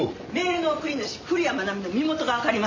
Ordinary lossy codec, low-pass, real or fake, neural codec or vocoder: MP3, 48 kbps; 7.2 kHz; real; none